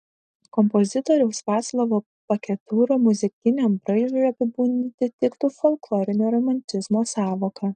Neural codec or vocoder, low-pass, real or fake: none; 9.9 kHz; real